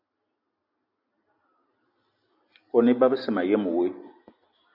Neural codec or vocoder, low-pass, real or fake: none; 5.4 kHz; real